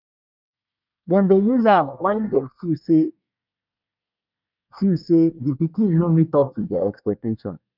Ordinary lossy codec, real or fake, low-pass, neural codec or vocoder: none; fake; 5.4 kHz; codec, 24 kHz, 1 kbps, SNAC